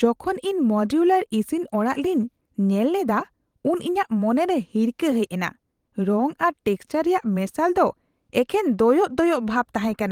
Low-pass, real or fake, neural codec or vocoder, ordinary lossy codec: 19.8 kHz; fake; vocoder, 44.1 kHz, 128 mel bands every 512 samples, BigVGAN v2; Opus, 24 kbps